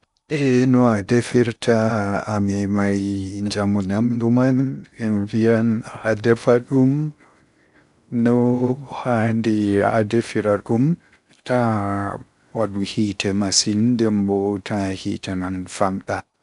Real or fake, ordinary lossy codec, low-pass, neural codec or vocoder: fake; none; 10.8 kHz; codec, 16 kHz in and 24 kHz out, 0.6 kbps, FocalCodec, streaming, 2048 codes